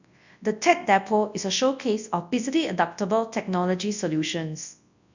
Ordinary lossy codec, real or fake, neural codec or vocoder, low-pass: none; fake; codec, 24 kHz, 0.9 kbps, WavTokenizer, large speech release; 7.2 kHz